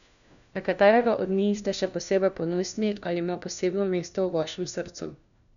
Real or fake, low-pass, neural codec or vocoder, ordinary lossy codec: fake; 7.2 kHz; codec, 16 kHz, 1 kbps, FunCodec, trained on LibriTTS, 50 frames a second; none